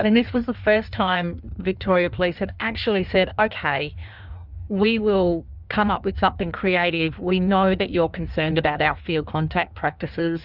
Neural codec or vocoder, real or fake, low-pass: codec, 16 kHz in and 24 kHz out, 1.1 kbps, FireRedTTS-2 codec; fake; 5.4 kHz